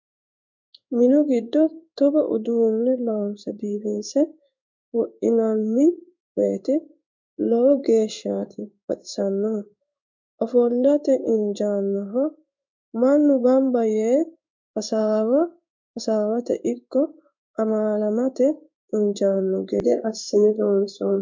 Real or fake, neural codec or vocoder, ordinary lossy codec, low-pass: fake; codec, 16 kHz in and 24 kHz out, 1 kbps, XY-Tokenizer; MP3, 64 kbps; 7.2 kHz